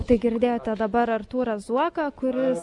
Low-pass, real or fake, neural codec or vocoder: 10.8 kHz; real; none